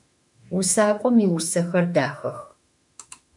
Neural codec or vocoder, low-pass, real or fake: autoencoder, 48 kHz, 32 numbers a frame, DAC-VAE, trained on Japanese speech; 10.8 kHz; fake